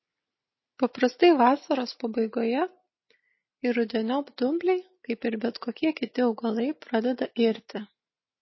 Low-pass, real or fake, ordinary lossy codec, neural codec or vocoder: 7.2 kHz; real; MP3, 24 kbps; none